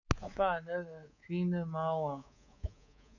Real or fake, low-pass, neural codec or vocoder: fake; 7.2 kHz; codec, 24 kHz, 3.1 kbps, DualCodec